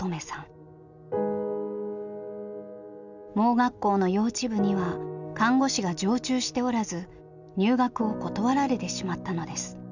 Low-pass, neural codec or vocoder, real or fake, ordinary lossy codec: 7.2 kHz; none; real; none